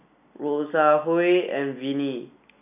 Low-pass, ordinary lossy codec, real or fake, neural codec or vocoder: 3.6 kHz; none; real; none